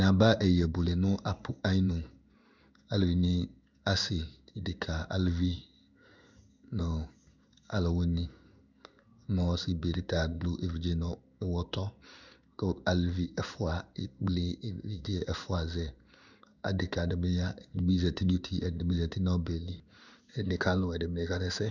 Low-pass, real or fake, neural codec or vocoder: 7.2 kHz; fake; codec, 16 kHz in and 24 kHz out, 1 kbps, XY-Tokenizer